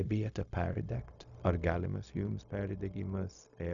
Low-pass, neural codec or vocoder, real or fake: 7.2 kHz; codec, 16 kHz, 0.4 kbps, LongCat-Audio-Codec; fake